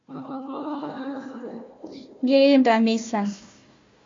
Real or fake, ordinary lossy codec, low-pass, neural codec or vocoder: fake; AAC, 48 kbps; 7.2 kHz; codec, 16 kHz, 1 kbps, FunCodec, trained on Chinese and English, 50 frames a second